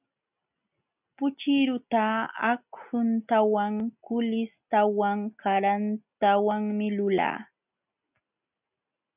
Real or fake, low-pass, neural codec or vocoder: real; 3.6 kHz; none